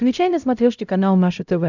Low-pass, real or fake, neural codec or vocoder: 7.2 kHz; fake; codec, 16 kHz, 0.5 kbps, X-Codec, HuBERT features, trained on LibriSpeech